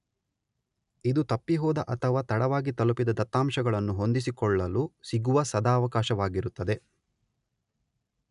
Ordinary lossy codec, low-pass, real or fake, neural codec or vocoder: none; 10.8 kHz; real; none